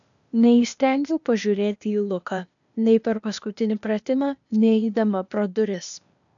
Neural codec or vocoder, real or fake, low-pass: codec, 16 kHz, 0.8 kbps, ZipCodec; fake; 7.2 kHz